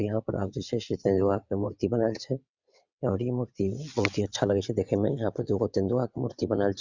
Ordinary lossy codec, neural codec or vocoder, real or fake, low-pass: Opus, 64 kbps; vocoder, 22.05 kHz, 80 mel bands, WaveNeXt; fake; 7.2 kHz